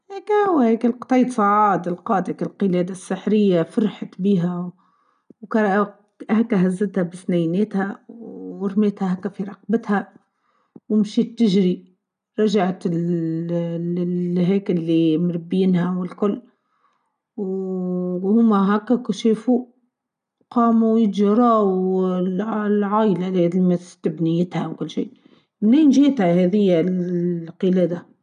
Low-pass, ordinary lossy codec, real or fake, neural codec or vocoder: 9.9 kHz; none; real; none